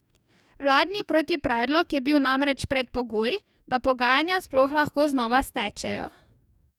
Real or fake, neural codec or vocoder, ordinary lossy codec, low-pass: fake; codec, 44.1 kHz, 2.6 kbps, DAC; none; 19.8 kHz